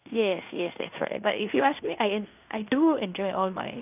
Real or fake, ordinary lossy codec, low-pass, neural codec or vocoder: fake; none; 3.6 kHz; codec, 16 kHz in and 24 kHz out, 0.9 kbps, LongCat-Audio-Codec, fine tuned four codebook decoder